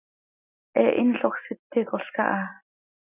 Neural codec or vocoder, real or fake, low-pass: none; real; 3.6 kHz